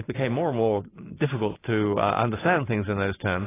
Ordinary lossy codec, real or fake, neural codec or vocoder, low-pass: AAC, 16 kbps; fake; vocoder, 44.1 kHz, 128 mel bands every 512 samples, BigVGAN v2; 3.6 kHz